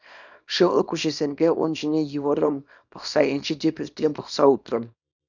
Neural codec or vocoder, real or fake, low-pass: codec, 24 kHz, 0.9 kbps, WavTokenizer, small release; fake; 7.2 kHz